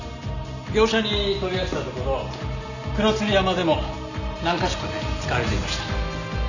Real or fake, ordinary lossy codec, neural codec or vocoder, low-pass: real; none; none; 7.2 kHz